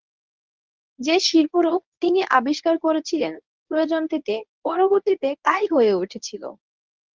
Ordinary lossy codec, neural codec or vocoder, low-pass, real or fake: Opus, 32 kbps; codec, 24 kHz, 0.9 kbps, WavTokenizer, medium speech release version 1; 7.2 kHz; fake